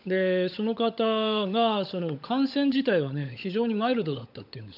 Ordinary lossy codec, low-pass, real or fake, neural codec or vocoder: none; 5.4 kHz; fake; codec, 16 kHz, 16 kbps, FunCodec, trained on LibriTTS, 50 frames a second